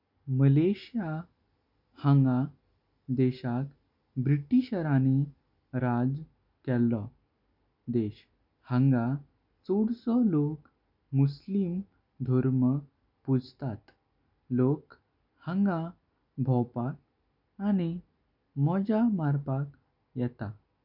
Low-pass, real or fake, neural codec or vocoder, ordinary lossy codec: 5.4 kHz; real; none; none